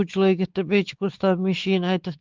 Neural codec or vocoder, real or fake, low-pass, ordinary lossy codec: codec, 16 kHz, 4 kbps, X-Codec, WavLM features, trained on Multilingual LibriSpeech; fake; 7.2 kHz; Opus, 16 kbps